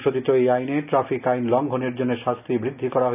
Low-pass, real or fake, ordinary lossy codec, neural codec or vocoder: 3.6 kHz; real; none; none